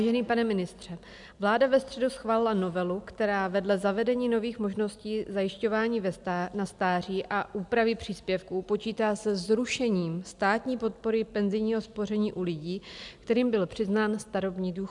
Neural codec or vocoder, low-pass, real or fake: none; 10.8 kHz; real